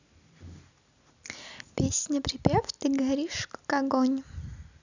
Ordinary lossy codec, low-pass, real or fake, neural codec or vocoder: none; 7.2 kHz; real; none